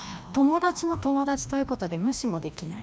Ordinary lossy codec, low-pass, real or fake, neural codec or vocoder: none; none; fake; codec, 16 kHz, 1 kbps, FreqCodec, larger model